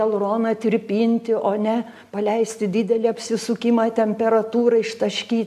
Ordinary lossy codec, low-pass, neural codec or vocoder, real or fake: AAC, 96 kbps; 14.4 kHz; none; real